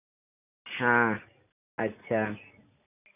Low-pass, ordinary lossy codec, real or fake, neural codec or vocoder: 3.6 kHz; none; real; none